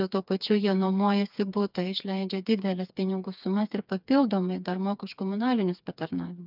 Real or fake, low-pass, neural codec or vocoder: fake; 5.4 kHz; codec, 16 kHz, 4 kbps, FreqCodec, smaller model